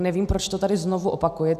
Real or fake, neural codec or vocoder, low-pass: real; none; 14.4 kHz